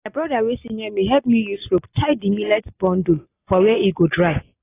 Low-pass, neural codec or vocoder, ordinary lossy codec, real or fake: 3.6 kHz; none; AAC, 16 kbps; real